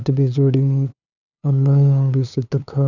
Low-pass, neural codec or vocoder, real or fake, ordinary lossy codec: 7.2 kHz; codec, 16 kHz, 2 kbps, FunCodec, trained on LibriTTS, 25 frames a second; fake; none